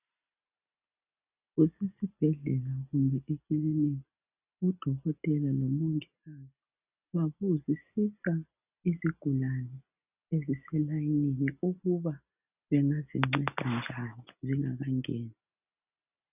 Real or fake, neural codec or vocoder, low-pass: real; none; 3.6 kHz